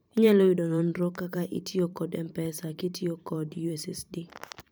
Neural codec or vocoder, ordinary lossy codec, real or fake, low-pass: vocoder, 44.1 kHz, 128 mel bands every 512 samples, BigVGAN v2; none; fake; none